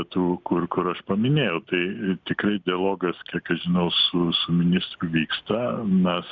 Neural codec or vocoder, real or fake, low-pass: none; real; 7.2 kHz